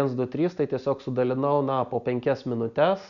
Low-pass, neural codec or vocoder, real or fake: 7.2 kHz; none; real